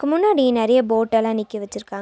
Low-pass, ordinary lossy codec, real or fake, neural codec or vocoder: none; none; real; none